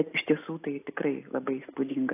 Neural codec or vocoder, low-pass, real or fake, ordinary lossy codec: none; 3.6 kHz; real; AAC, 24 kbps